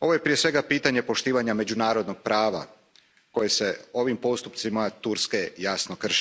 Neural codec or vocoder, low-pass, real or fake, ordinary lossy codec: none; none; real; none